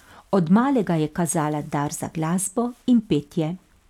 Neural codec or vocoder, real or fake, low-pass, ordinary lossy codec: none; real; 19.8 kHz; none